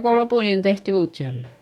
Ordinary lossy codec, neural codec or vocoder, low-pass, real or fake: none; codec, 44.1 kHz, 2.6 kbps, DAC; 19.8 kHz; fake